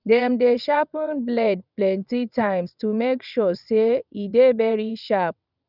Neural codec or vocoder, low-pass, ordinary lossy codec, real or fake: vocoder, 22.05 kHz, 80 mel bands, WaveNeXt; 5.4 kHz; none; fake